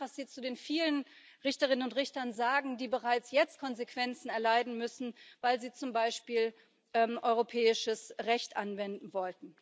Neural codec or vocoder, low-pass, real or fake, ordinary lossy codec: none; none; real; none